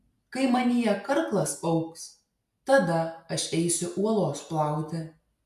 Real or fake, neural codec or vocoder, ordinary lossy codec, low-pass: real; none; AAC, 96 kbps; 14.4 kHz